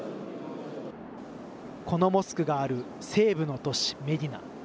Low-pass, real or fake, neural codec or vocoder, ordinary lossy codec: none; real; none; none